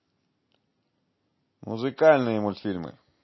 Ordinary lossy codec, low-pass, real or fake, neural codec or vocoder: MP3, 24 kbps; 7.2 kHz; real; none